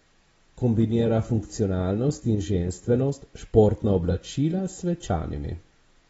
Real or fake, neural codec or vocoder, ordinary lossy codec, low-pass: real; none; AAC, 24 kbps; 10.8 kHz